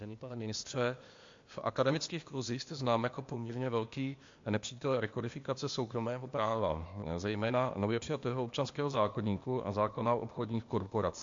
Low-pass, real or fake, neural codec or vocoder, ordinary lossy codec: 7.2 kHz; fake; codec, 16 kHz, 0.8 kbps, ZipCodec; MP3, 48 kbps